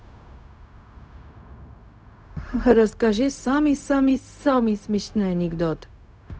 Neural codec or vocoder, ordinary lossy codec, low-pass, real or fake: codec, 16 kHz, 0.4 kbps, LongCat-Audio-Codec; none; none; fake